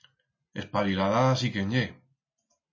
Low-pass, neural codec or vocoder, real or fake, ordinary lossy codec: 7.2 kHz; none; real; MP3, 32 kbps